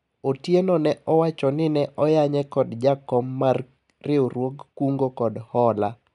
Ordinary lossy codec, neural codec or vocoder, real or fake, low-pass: none; none; real; 10.8 kHz